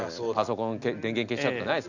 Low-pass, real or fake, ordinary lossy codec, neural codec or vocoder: 7.2 kHz; real; none; none